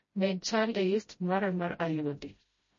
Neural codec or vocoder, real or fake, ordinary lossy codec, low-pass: codec, 16 kHz, 0.5 kbps, FreqCodec, smaller model; fake; MP3, 32 kbps; 7.2 kHz